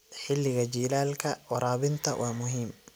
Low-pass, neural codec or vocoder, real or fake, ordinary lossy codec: none; none; real; none